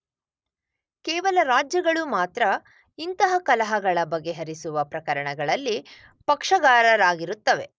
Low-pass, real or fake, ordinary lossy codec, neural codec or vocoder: none; real; none; none